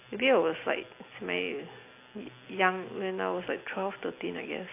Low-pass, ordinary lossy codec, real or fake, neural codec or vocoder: 3.6 kHz; AAC, 24 kbps; real; none